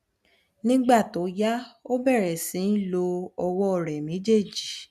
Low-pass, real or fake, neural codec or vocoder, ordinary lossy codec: 14.4 kHz; real; none; none